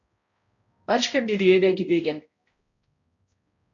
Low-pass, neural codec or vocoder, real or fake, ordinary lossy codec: 7.2 kHz; codec, 16 kHz, 0.5 kbps, X-Codec, HuBERT features, trained on balanced general audio; fake; MP3, 48 kbps